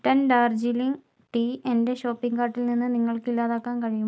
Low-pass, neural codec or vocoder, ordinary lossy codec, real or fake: none; none; none; real